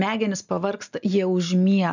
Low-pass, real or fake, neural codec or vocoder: 7.2 kHz; real; none